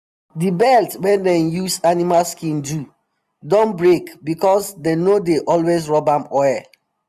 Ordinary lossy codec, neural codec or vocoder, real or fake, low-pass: none; none; real; 14.4 kHz